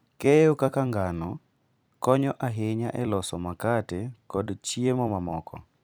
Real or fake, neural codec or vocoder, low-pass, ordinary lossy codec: fake; vocoder, 44.1 kHz, 128 mel bands every 256 samples, BigVGAN v2; none; none